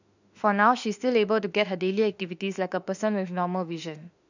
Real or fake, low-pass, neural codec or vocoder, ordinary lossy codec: fake; 7.2 kHz; autoencoder, 48 kHz, 32 numbers a frame, DAC-VAE, trained on Japanese speech; none